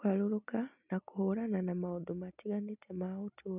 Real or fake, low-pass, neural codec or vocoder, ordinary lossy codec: real; 3.6 kHz; none; none